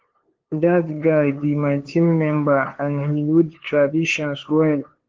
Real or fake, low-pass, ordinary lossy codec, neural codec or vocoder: fake; 7.2 kHz; Opus, 16 kbps; codec, 16 kHz, 2 kbps, FunCodec, trained on LibriTTS, 25 frames a second